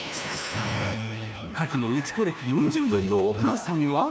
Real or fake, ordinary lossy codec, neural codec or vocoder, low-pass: fake; none; codec, 16 kHz, 1 kbps, FunCodec, trained on LibriTTS, 50 frames a second; none